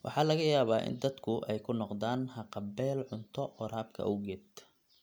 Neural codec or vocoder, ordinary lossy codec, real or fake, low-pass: none; none; real; none